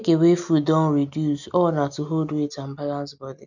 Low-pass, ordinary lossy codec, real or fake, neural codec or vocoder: 7.2 kHz; none; real; none